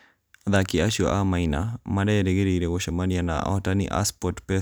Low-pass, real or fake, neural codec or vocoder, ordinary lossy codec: none; real; none; none